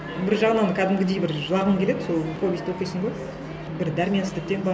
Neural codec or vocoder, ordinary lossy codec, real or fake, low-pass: none; none; real; none